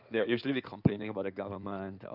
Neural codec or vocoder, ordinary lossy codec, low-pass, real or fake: codec, 16 kHz in and 24 kHz out, 2.2 kbps, FireRedTTS-2 codec; none; 5.4 kHz; fake